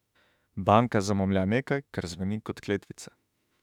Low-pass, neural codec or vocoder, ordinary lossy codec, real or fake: 19.8 kHz; autoencoder, 48 kHz, 32 numbers a frame, DAC-VAE, trained on Japanese speech; none; fake